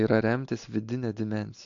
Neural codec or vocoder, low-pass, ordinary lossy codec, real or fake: none; 7.2 kHz; AAC, 64 kbps; real